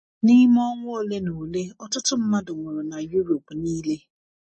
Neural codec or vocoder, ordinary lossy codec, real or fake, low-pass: none; MP3, 32 kbps; real; 10.8 kHz